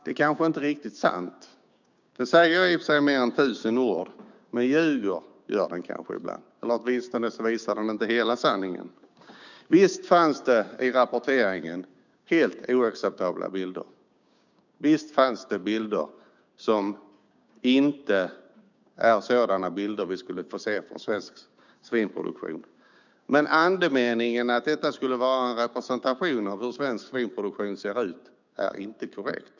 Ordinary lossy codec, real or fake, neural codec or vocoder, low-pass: none; fake; codec, 16 kHz, 6 kbps, DAC; 7.2 kHz